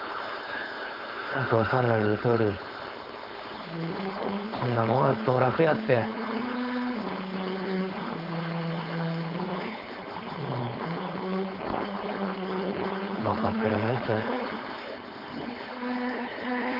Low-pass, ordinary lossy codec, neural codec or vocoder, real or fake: 5.4 kHz; none; codec, 16 kHz, 4.8 kbps, FACodec; fake